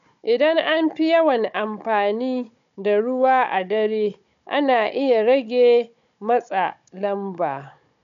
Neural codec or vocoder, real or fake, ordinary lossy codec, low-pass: codec, 16 kHz, 16 kbps, FunCodec, trained on Chinese and English, 50 frames a second; fake; MP3, 96 kbps; 7.2 kHz